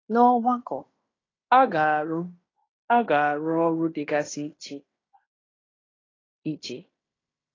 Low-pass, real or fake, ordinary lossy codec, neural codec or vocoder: 7.2 kHz; fake; AAC, 32 kbps; codec, 16 kHz in and 24 kHz out, 0.9 kbps, LongCat-Audio-Codec, fine tuned four codebook decoder